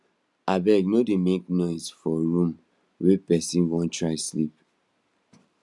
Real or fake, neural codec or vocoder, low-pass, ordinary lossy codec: real; none; none; none